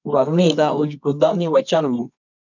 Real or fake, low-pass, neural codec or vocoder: fake; 7.2 kHz; codec, 24 kHz, 0.9 kbps, WavTokenizer, medium music audio release